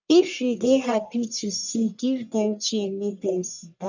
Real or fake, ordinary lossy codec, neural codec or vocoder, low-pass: fake; none; codec, 44.1 kHz, 1.7 kbps, Pupu-Codec; 7.2 kHz